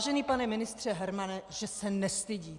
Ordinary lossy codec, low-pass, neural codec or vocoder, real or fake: Opus, 64 kbps; 10.8 kHz; none; real